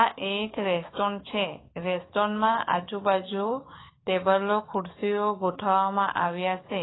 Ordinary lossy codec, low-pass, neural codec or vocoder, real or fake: AAC, 16 kbps; 7.2 kHz; codec, 44.1 kHz, 7.8 kbps, DAC; fake